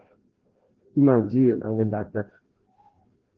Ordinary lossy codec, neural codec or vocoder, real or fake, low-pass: Opus, 16 kbps; codec, 16 kHz, 1 kbps, FreqCodec, larger model; fake; 7.2 kHz